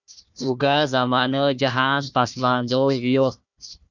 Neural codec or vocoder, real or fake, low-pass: codec, 16 kHz, 1 kbps, FunCodec, trained on Chinese and English, 50 frames a second; fake; 7.2 kHz